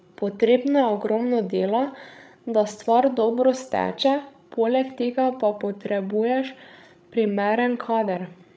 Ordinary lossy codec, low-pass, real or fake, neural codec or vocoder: none; none; fake; codec, 16 kHz, 16 kbps, FreqCodec, larger model